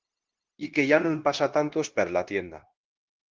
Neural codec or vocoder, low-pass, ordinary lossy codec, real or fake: codec, 16 kHz, 0.9 kbps, LongCat-Audio-Codec; 7.2 kHz; Opus, 16 kbps; fake